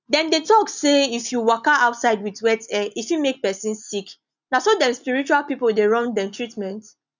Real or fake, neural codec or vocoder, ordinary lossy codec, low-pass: real; none; none; 7.2 kHz